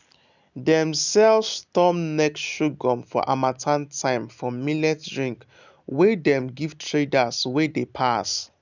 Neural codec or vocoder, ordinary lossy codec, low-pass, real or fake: none; none; 7.2 kHz; real